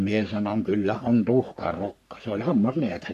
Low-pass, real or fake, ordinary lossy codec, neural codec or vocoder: 14.4 kHz; fake; none; codec, 44.1 kHz, 3.4 kbps, Pupu-Codec